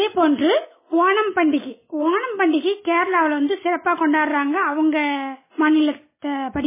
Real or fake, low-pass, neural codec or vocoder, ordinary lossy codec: real; 3.6 kHz; none; MP3, 16 kbps